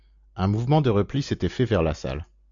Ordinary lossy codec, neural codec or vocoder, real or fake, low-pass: AAC, 64 kbps; none; real; 7.2 kHz